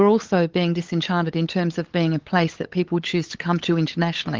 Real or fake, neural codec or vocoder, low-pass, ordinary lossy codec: fake; codec, 16 kHz, 8 kbps, FunCodec, trained on LibriTTS, 25 frames a second; 7.2 kHz; Opus, 16 kbps